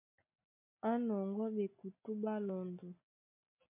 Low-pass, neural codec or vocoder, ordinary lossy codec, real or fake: 3.6 kHz; none; MP3, 24 kbps; real